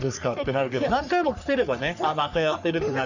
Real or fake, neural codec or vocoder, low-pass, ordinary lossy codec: fake; codec, 44.1 kHz, 3.4 kbps, Pupu-Codec; 7.2 kHz; none